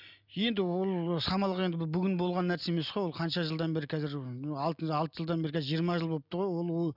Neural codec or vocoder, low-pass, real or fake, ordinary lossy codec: none; 5.4 kHz; real; none